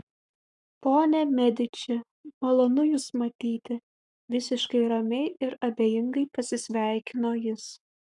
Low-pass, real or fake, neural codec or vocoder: 10.8 kHz; fake; codec, 44.1 kHz, 7.8 kbps, Pupu-Codec